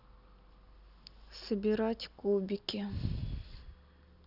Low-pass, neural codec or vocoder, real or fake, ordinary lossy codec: 5.4 kHz; none; real; none